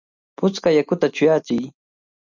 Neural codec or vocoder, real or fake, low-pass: none; real; 7.2 kHz